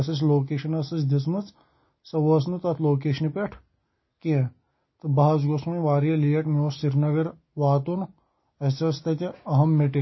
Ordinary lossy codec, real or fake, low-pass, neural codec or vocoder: MP3, 24 kbps; real; 7.2 kHz; none